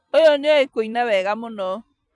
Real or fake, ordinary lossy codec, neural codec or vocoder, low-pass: fake; none; vocoder, 44.1 kHz, 128 mel bands every 256 samples, BigVGAN v2; 10.8 kHz